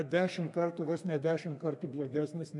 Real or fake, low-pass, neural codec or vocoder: fake; 10.8 kHz; codec, 32 kHz, 1.9 kbps, SNAC